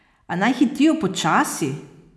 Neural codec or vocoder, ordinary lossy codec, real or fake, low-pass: none; none; real; none